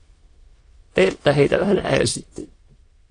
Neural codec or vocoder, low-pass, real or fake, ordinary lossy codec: autoencoder, 22.05 kHz, a latent of 192 numbers a frame, VITS, trained on many speakers; 9.9 kHz; fake; AAC, 32 kbps